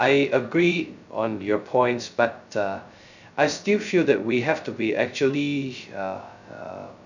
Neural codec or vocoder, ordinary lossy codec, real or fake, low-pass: codec, 16 kHz, 0.2 kbps, FocalCodec; none; fake; 7.2 kHz